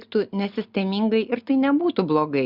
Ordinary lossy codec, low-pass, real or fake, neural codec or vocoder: Opus, 64 kbps; 5.4 kHz; real; none